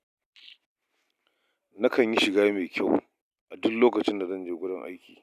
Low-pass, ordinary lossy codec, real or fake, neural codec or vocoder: 14.4 kHz; none; real; none